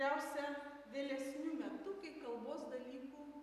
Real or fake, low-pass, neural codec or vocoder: real; 14.4 kHz; none